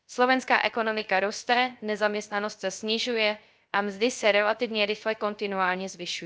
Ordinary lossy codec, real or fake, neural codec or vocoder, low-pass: none; fake; codec, 16 kHz, 0.3 kbps, FocalCodec; none